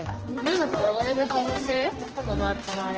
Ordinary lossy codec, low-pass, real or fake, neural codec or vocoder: Opus, 16 kbps; 7.2 kHz; fake; codec, 16 kHz, 1 kbps, X-Codec, HuBERT features, trained on general audio